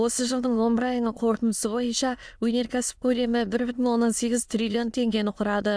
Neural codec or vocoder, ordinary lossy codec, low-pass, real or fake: autoencoder, 22.05 kHz, a latent of 192 numbers a frame, VITS, trained on many speakers; none; none; fake